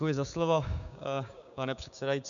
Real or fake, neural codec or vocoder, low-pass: fake; codec, 16 kHz, 6 kbps, DAC; 7.2 kHz